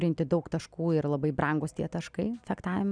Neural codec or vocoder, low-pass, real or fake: none; 9.9 kHz; real